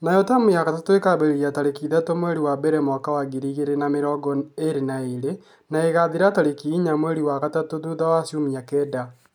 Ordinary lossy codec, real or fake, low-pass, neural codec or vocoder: none; real; 19.8 kHz; none